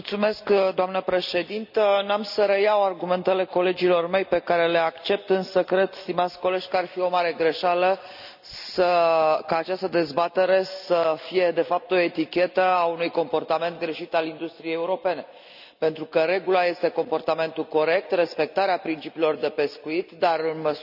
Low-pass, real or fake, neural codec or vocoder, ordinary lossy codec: 5.4 kHz; real; none; none